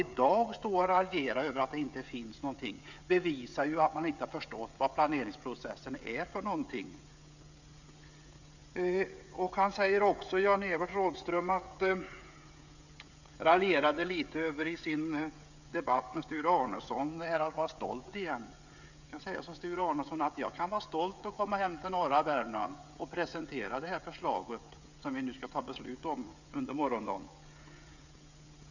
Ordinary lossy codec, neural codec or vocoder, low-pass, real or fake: none; codec, 16 kHz, 16 kbps, FreqCodec, smaller model; 7.2 kHz; fake